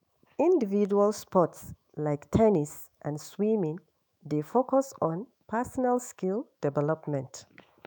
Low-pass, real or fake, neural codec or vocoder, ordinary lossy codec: none; fake; autoencoder, 48 kHz, 128 numbers a frame, DAC-VAE, trained on Japanese speech; none